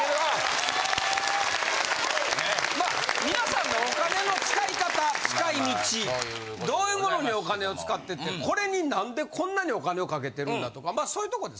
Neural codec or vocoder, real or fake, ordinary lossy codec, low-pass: none; real; none; none